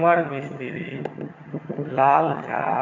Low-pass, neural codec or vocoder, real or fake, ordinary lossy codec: 7.2 kHz; vocoder, 22.05 kHz, 80 mel bands, HiFi-GAN; fake; none